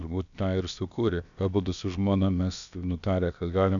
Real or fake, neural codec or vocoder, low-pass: fake; codec, 16 kHz, about 1 kbps, DyCAST, with the encoder's durations; 7.2 kHz